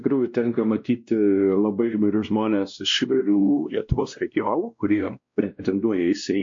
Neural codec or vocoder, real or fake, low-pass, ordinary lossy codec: codec, 16 kHz, 1 kbps, X-Codec, WavLM features, trained on Multilingual LibriSpeech; fake; 7.2 kHz; MP3, 48 kbps